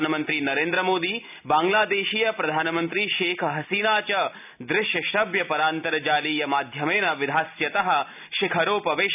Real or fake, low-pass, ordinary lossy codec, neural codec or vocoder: real; 3.6 kHz; none; none